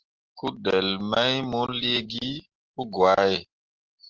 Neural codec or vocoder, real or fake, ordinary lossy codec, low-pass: none; real; Opus, 16 kbps; 7.2 kHz